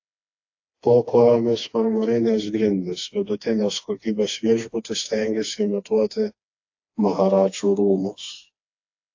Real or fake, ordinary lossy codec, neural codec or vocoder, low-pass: fake; AAC, 48 kbps; codec, 16 kHz, 2 kbps, FreqCodec, smaller model; 7.2 kHz